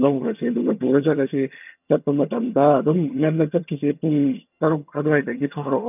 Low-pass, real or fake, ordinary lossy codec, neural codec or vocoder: 3.6 kHz; fake; none; vocoder, 22.05 kHz, 80 mel bands, HiFi-GAN